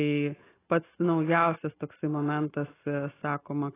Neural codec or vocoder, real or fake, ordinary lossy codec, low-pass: none; real; AAC, 16 kbps; 3.6 kHz